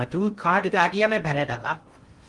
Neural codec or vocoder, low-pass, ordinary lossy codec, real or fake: codec, 16 kHz in and 24 kHz out, 0.6 kbps, FocalCodec, streaming, 2048 codes; 10.8 kHz; Opus, 24 kbps; fake